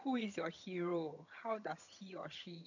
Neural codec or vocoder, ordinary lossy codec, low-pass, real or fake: vocoder, 22.05 kHz, 80 mel bands, HiFi-GAN; none; 7.2 kHz; fake